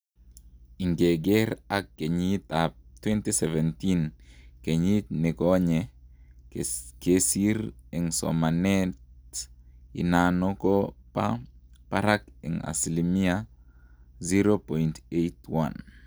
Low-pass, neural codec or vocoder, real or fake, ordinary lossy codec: none; none; real; none